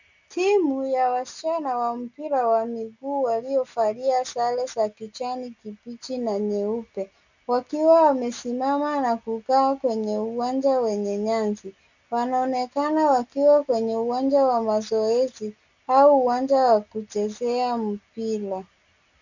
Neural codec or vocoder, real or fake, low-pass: none; real; 7.2 kHz